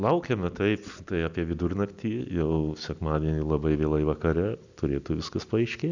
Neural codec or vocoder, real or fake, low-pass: none; real; 7.2 kHz